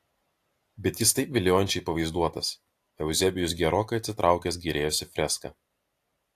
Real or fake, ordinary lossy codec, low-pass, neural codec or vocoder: real; AAC, 64 kbps; 14.4 kHz; none